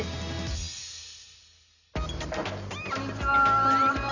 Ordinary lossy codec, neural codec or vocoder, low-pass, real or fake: none; none; 7.2 kHz; real